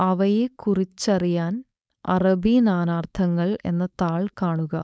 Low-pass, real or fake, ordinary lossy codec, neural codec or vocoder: none; fake; none; codec, 16 kHz, 4.8 kbps, FACodec